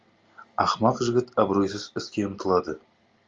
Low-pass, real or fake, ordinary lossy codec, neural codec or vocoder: 7.2 kHz; real; Opus, 32 kbps; none